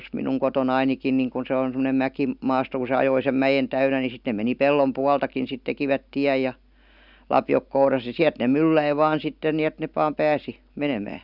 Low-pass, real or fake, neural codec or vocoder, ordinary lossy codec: 5.4 kHz; real; none; none